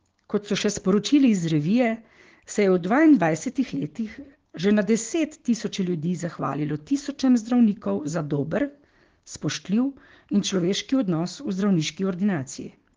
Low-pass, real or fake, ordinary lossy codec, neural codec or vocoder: 7.2 kHz; fake; Opus, 16 kbps; codec, 16 kHz, 6 kbps, DAC